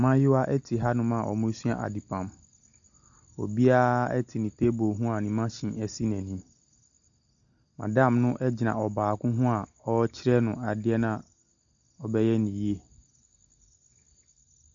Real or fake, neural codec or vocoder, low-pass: real; none; 7.2 kHz